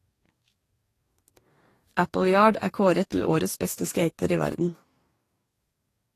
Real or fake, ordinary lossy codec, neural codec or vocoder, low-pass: fake; AAC, 48 kbps; codec, 44.1 kHz, 2.6 kbps, DAC; 14.4 kHz